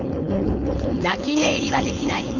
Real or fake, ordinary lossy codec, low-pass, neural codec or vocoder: fake; none; 7.2 kHz; codec, 16 kHz, 4.8 kbps, FACodec